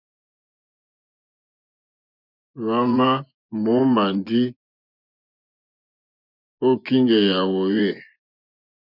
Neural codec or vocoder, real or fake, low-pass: vocoder, 24 kHz, 100 mel bands, Vocos; fake; 5.4 kHz